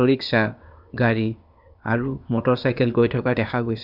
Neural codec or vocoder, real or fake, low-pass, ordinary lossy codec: codec, 16 kHz, 0.8 kbps, ZipCodec; fake; 5.4 kHz; none